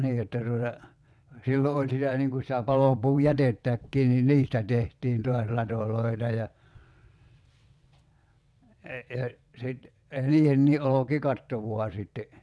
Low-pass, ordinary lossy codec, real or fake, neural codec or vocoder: none; none; fake; vocoder, 22.05 kHz, 80 mel bands, WaveNeXt